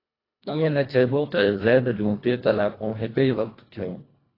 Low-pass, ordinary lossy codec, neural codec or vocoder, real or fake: 5.4 kHz; AAC, 24 kbps; codec, 24 kHz, 1.5 kbps, HILCodec; fake